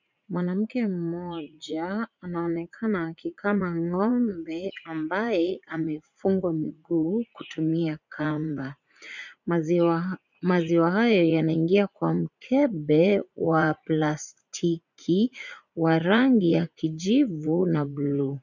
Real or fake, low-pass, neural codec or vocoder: fake; 7.2 kHz; vocoder, 44.1 kHz, 80 mel bands, Vocos